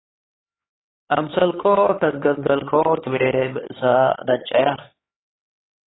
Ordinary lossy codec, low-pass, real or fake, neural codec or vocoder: AAC, 16 kbps; 7.2 kHz; fake; codec, 16 kHz, 4 kbps, X-Codec, HuBERT features, trained on LibriSpeech